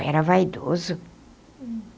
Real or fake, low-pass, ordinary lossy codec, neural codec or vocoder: real; none; none; none